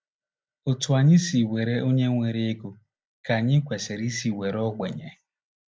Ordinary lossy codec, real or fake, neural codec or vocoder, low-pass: none; real; none; none